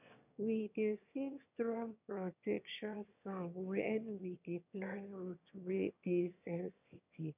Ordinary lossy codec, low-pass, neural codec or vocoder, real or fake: none; 3.6 kHz; autoencoder, 22.05 kHz, a latent of 192 numbers a frame, VITS, trained on one speaker; fake